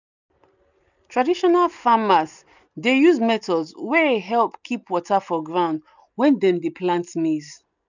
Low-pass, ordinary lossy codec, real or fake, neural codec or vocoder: 7.2 kHz; none; real; none